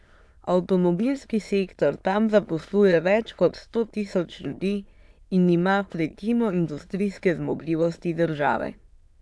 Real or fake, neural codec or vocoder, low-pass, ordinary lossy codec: fake; autoencoder, 22.05 kHz, a latent of 192 numbers a frame, VITS, trained on many speakers; none; none